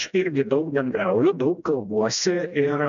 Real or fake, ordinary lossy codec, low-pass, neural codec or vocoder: fake; MP3, 96 kbps; 7.2 kHz; codec, 16 kHz, 1 kbps, FreqCodec, smaller model